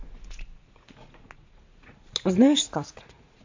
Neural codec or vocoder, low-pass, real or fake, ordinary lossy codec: vocoder, 44.1 kHz, 80 mel bands, Vocos; 7.2 kHz; fake; AAC, 48 kbps